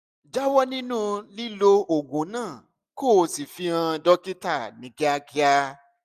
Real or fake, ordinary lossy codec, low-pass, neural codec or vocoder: real; none; 14.4 kHz; none